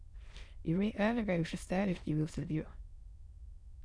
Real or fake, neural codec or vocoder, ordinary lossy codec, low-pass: fake; autoencoder, 22.05 kHz, a latent of 192 numbers a frame, VITS, trained on many speakers; none; none